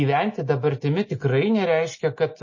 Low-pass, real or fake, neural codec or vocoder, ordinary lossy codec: 7.2 kHz; real; none; MP3, 32 kbps